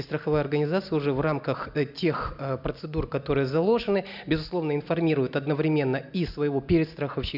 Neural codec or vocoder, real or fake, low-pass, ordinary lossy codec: none; real; 5.4 kHz; none